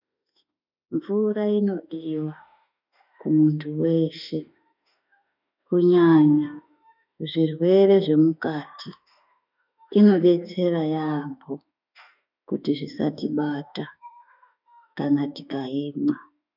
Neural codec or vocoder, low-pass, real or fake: autoencoder, 48 kHz, 32 numbers a frame, DAC-VAE, trained on Japanese speech; 5.4 kHz; fake